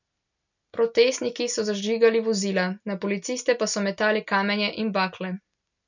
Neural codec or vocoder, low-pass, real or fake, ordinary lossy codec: none; 7.2 kHz; real; none